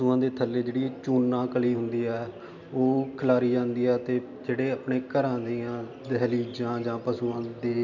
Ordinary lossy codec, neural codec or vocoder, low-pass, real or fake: none; none; 7.2 kHz; real